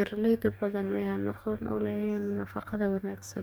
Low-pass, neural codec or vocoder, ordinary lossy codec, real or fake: none; codec, 44.1 kHz, 2.6 kbps, DAC; none; fake